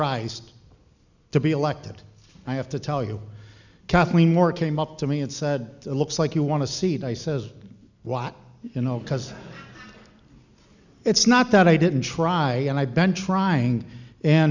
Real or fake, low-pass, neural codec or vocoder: real; 7.2 kHz; none